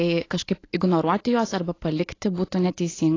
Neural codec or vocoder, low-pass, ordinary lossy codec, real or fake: none; 7.2 kHz; AAC, 32 kbps; real